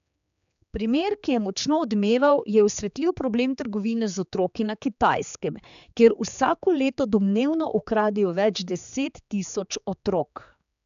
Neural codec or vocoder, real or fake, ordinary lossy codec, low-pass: codec, 16 kHz, 4 kbps, X-Codec, HuBERT features, trained on general audio; fake; none; 7.2 kHz